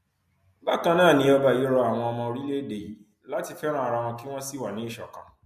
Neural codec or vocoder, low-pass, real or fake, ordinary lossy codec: none; 14.4 kHz; real; MP3, 64 kbps